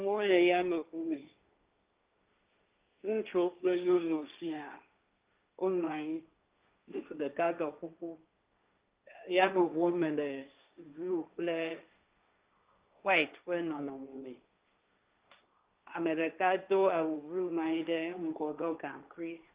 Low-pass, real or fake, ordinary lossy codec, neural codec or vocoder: 3.6 kHz; fake; Opus, 32 kbps; codec, 16 kHz, 1.1 kbps, Voila-Tokenizer